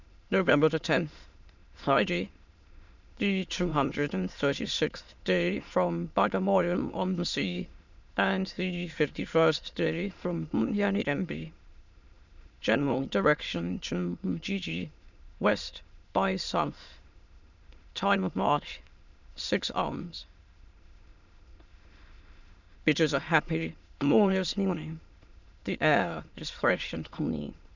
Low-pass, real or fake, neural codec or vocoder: 7.2 kHz; fake; autoencoder, 22.05 kHz, a latent of 192 numbers a frame, VITS, trained on many speakers